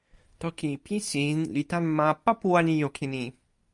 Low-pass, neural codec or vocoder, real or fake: 10.8 kHz; none; real